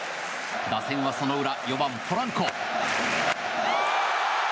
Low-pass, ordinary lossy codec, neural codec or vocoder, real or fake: none; none; none; real